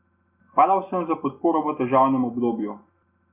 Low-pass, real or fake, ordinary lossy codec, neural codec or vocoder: 3.6 kHz; real; Opus, 64 kbps; none